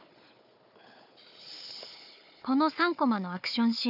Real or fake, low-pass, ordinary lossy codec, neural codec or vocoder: fake; 5.4 kHz; none; codec, 16 kHz, 4 kbps, FunCodec, trained on Chinese and English, 50 frames a second